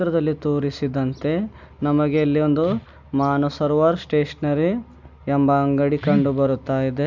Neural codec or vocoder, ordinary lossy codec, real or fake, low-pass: none; none; real; 7.2 kHz